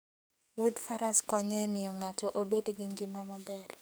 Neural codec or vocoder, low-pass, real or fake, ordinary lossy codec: codec, 44.1 kHz, 2.6 kbps, SNAC; none; fake; none